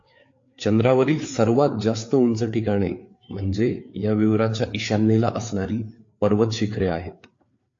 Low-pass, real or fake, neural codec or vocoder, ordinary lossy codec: 7.2 kHz; fake; codec, 16 kHz, 4 kbps, FreqCodec, larger model; AAC, 48 kbps